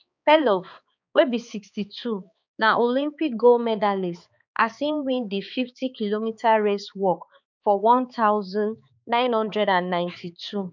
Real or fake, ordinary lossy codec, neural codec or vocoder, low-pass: fake; none; codec, 16 kHz, 4 kbps, X-Codec, HuBERT features, trained on balanced general audio; 7.2 kHz